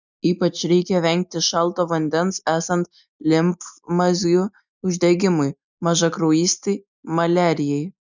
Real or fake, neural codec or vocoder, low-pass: real; none; 7.2 kHz